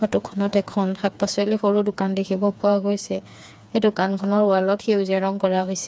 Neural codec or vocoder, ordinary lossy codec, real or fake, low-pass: codec, 16 kHz, 4 kbps, FreqCodec, smaller model; none; fake; none